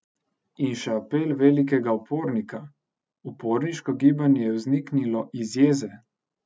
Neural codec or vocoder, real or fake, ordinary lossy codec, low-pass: none; real; none; none